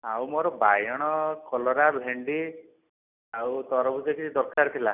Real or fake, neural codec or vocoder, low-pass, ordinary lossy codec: real; none; 3.6 kHz; none